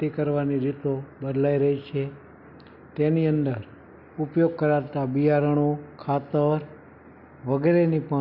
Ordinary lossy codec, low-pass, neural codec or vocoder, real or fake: none; 5.4 kHz; none; real